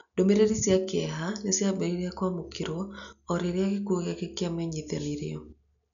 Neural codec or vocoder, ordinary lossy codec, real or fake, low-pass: none; none; real; 7.2 kHz